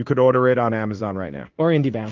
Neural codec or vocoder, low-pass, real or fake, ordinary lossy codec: codec, 16 kHz in and 24 kHz out, 1 kbps, XY-Tokenizer; 7.2 kHz; fake; Opus, 32 kbps